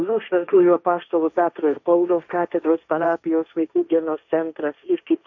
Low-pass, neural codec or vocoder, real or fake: 7.2 kHz; codec, 16 kHz, 1.1 kbps, Voila-Tokenizer; fake